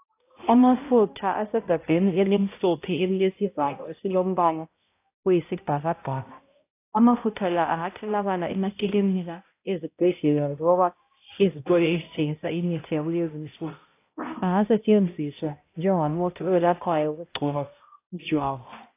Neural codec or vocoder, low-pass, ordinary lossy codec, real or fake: codec, 16 kHz, 0.5 kbps, X-Codec, HuBERT features, trained on balanced general audio; 3.6 kHz; AAC, 24 kbps; fake